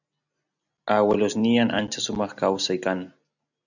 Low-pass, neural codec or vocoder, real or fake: 7.2 kHz; none; real